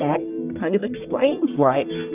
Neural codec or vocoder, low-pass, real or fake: codec, 44.1 kHz, 1.7 kbps, Pupu-Codec; 3.6 kHz; fake